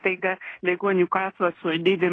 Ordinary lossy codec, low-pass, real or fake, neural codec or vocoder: AAC, 32 kbps; 9.9 kHz; fake; codec, 16 kHz in and 24 kHz out, 0.9 kbps, LongCat-Audio-Codec, fine tuned four codebook decoder